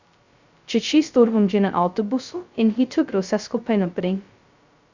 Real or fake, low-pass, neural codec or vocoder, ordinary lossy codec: fake; 7.2 kHz; codec, 16 kHz, 0.2 kbps, FocalCodec; Opus, 64 kbps